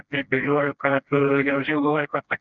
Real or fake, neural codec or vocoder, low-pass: fake; codec, 16 kHz, 1 kbps, FreqCodec, smaller model; 7.2 kHz